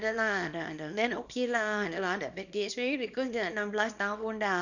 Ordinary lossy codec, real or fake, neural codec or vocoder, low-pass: none; fake; codec, 24 kHz, 0.9 kbps, WavTokenizer, small release; 7.2 kHz